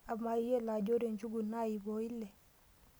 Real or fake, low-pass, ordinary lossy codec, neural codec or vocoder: real; none; none; none